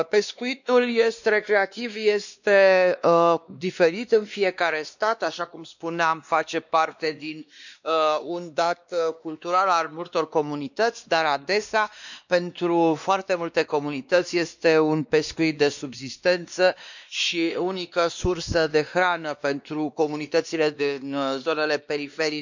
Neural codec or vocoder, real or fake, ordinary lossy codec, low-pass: codec, 16 kHz, 2 kbps, X-Codec, WavLM features, trained on Multilingual LibriSpeech; fake; none; 7.2 kHz